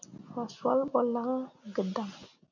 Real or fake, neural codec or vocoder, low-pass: real; none; 7.2 kHz